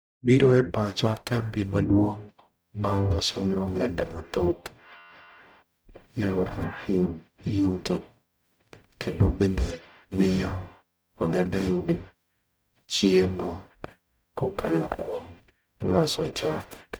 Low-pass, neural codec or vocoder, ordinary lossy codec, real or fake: none; codec, 44.1 kHz, 0.9 kbps, DAC; none; fake